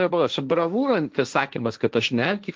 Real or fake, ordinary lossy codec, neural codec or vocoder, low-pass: fake; Opus, 32 kbps; codec, 16 kHz, 1.1 kbps, Voila-Tokenizer; 7.2 kHz